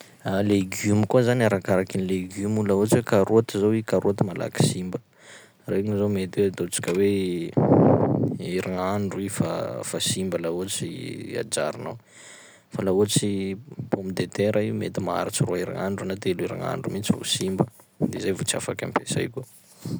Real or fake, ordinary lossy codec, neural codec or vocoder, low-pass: real; none; none; none